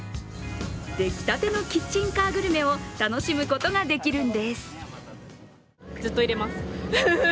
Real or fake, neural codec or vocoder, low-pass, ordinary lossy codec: real; none; none; none